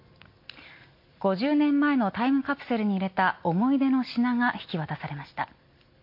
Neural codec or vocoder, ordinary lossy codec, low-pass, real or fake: none; MP3, 32 kbps; 5.4 kHz; real